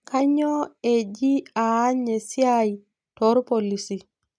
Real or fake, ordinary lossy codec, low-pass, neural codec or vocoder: real; none; 9.9 kHz; none